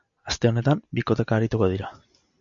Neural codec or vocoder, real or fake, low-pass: none; real; 7.2 kHz